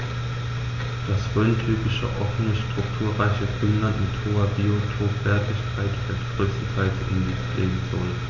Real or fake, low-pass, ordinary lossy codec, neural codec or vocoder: real; 7.2 kHz; none; none